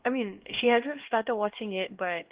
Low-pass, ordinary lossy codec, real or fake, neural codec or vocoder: 3.6 kHz; Opus, 16 kbps; fake; codec, 16 kHz, 1 kbps, X-Codec, HuBERT features, trained on LibriSpeech